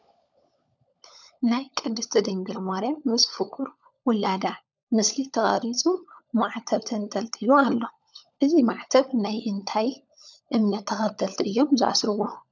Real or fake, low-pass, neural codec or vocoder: fake; 7.2 kHz; codec, 16 kHz, 16 kbps, FunCodec, trained on LibriTTS, 50 frames a second